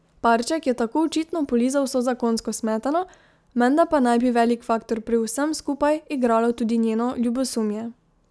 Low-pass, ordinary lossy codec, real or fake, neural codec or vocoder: none; none; real; none